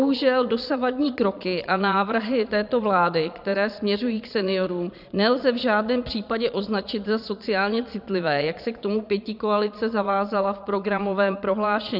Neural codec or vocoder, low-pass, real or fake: vocoder, 22.05 kHz, 80 mel bands, Vocos; 5.4 kHz; fake